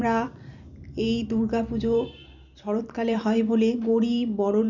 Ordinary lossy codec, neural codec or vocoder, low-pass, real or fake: none; vocoder, 44.1 kHz, 128 mel bands every 256 samples, BigVGAN v2; 7.2 kHz; fake